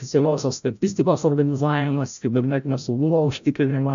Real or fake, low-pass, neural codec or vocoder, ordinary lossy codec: fake; 7.2 kHz; codec, 16 kHz, 0.5 kbps, FreqCodec, larger model; AAC, 96 kbps